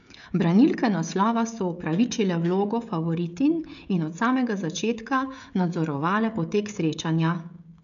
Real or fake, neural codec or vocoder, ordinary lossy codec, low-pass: fake; codec, 16 kHz, 16 kbps, FreqCodec, smaller model; none; 7.2 kHz